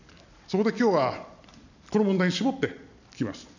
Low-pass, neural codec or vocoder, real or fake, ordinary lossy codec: 7.2 kHz; none; real; none